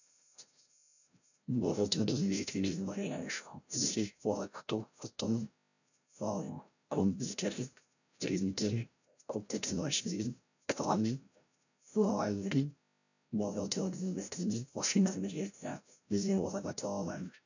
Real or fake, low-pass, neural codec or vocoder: fake; 7.2 kHz; codec, 16 kHz, 0.5 kbps, FreqCodec, larger model